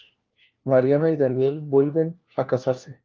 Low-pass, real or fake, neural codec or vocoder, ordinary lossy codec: 7.2 kHz; fake; codec, 16 kHz, 1 kbps, FunCodec, trained on LibriTTS, 50 frames a second; Opus, 24 kbps